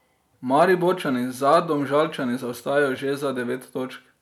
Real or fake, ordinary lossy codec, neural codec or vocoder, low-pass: real; none; none; 19.8 kHz